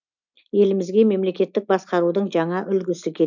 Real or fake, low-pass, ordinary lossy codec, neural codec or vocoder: real; 7.2 kHz; none; none